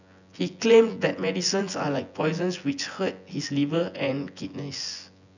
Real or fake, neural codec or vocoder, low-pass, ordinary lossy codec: fake; vocoder, 24 kHz, 100 mel bands, Vocos; 7.2 kHz; none